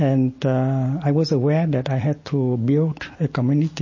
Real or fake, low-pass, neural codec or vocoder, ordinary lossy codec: real; 7.2 kHz; none; MP3, 32 kbps